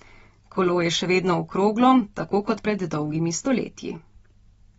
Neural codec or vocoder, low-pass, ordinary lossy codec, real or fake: none; 19.8 kHz; AAC, 24 kbps; real